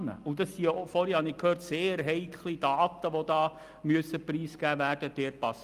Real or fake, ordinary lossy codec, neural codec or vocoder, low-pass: real; Opus, 32 kbps; none; 14.4 kHz